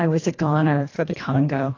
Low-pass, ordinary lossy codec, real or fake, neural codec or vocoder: 7.2 kHz; AAC, 32 kbps; fake; codec, 24 kHz, 1.5 kbps, HILCodec